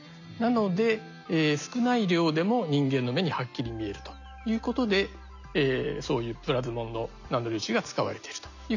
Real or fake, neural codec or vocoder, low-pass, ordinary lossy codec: real; none; 7.2 kHz; none